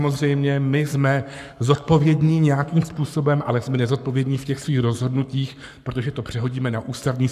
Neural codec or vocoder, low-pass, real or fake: codec, 44.1 kHz, 7.8 kbps, Pupu-Codec; 14.4 kHz; fake